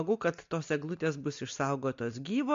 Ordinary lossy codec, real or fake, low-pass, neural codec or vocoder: MP3, 48 kbps; real; 7.2 kHz; none